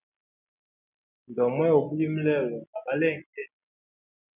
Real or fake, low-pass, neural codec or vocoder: real; 3.6 kHz; none